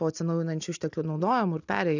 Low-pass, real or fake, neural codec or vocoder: 7.2 kHz; real; none